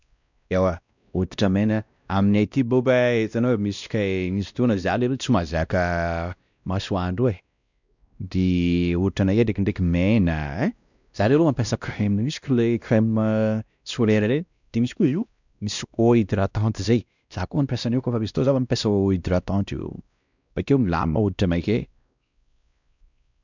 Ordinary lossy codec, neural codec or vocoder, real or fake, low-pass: none; codec, 16 kHz, 1 kbps, X-Codec, WavLM features, trained on Multilingual LibriSpeech; fake; 7.2 kHz